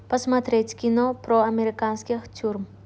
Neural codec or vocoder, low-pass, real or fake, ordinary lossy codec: none; none; real; none